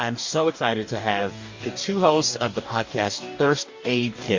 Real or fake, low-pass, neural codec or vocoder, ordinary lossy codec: fake; 7.2 kHz; codec, 44.1 kHz, 2.6 kbps, DAC; MP3, 48 kbps